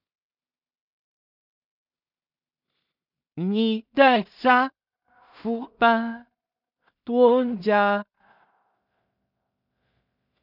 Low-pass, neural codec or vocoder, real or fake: 5.4 kHz; codec, 16 kHz in and 24 kHz out, 0.4 kbps, LongCat-Audio-Codec, two codebook decoder; fake